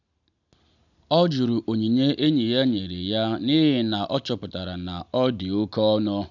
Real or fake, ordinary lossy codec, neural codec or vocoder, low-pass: real; none; none; 7.2 kHz